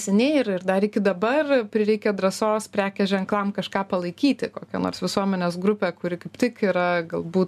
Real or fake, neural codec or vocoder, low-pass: real; none; 14.4 kHz